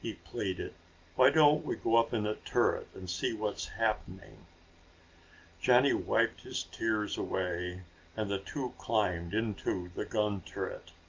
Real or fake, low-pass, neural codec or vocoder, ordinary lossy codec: real; 7.2 kHz; none; Opus, 24 kbps